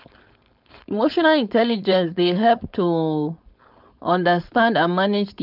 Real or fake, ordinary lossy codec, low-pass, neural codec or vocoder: fake; none; 5.4 kHz; codec, 16 kHz, 4.8 kbps, FACodec